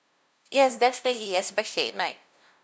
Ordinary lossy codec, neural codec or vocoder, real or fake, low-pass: none; codec, 16 kHz, 0.5 kbps, FunCodec, trained on LibriTTS, 25 frames a second; fake; none